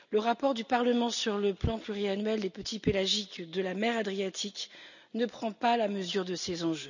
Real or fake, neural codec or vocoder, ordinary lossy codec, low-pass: real; none; none; 7.2 kHz